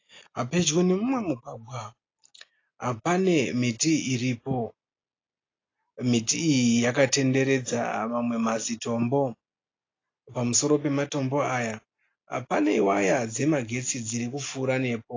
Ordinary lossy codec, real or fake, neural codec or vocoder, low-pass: AAC, 32 kbps; real; none; 7.2 kHz